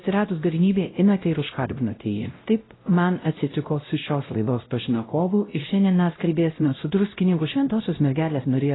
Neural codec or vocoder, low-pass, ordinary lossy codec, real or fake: codec, 16 kHz, 0.5 kbps, X-Codec, WavLM features, trained on Multilingual LibriSpeech; 7.2 kHz; AAC, 16 kbps; fake